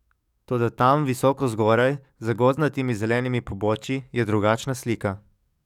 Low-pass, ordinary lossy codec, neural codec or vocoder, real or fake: 19.8 kHz; none; codec, 44.1 kHz, 7.8 kbps, DAC; fake